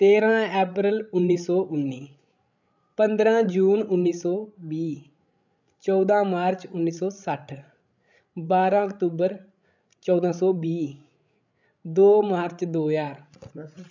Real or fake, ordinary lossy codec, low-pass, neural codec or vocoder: fake; none; 7.2 kHz; codec, 16 kHz, 16 kbps, FreqCodec, larger model